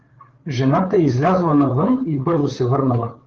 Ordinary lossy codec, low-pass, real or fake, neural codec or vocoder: Opus, 16 kbps; 7.2 kHz; fake; codec, 16 kHz, 8 kbps, FreqCodec, larger model